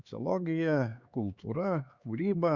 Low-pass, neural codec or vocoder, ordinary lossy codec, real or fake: 7.2 kHz; codec, 16 kHz, 4 kbps, X-Codec, HuBERT features, trained on LibriSpeech; Opus, 64 kbps; fake